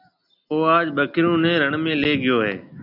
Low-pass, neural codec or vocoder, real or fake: 5.4 kHz; none; real